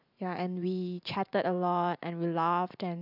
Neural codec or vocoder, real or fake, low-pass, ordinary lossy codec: vocoder, 44.1 kHz, 128 mel bands every 512 samples, BigVGAN v2; fake; 5.4 kHz; none